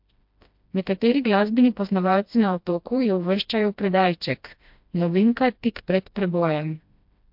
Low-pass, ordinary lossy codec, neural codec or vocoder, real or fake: 5.4 kHz; MP3, 48 kbps; codec, 16 kHz, 1 kbps, FreqCodec, smaller model; fake